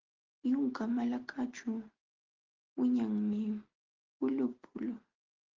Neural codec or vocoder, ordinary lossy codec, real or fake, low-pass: none; Opus, 16 kbps; real; 7.2 kHz